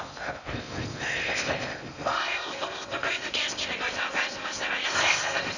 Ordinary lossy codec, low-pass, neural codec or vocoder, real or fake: none; 7.2 kHz; codec, 16 kHz in and 24 kHz out, 0.6 kbps, FocalCodec, streaming, 4096 codes; fake